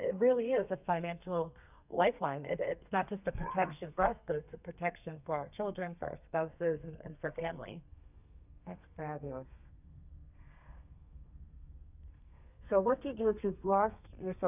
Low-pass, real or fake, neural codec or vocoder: 3.6 kHz; fake; codec, 32 kHz, 1.9 kbps, SNAC